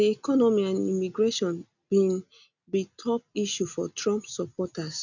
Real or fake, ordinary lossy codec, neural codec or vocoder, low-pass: real; none; none; 7.2 kHz